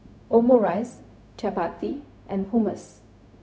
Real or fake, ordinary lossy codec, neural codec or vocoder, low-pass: fake; none; codec, 16 kHz, 0.4 kbps, LongCat-Audio-Codec; none